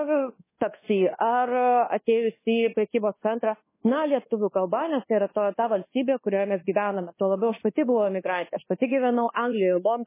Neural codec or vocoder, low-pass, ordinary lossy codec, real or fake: codec, 24 kHz, 1.2 kbps, DualCodec; 3.6 kHz; MP3, 16 kbps; fake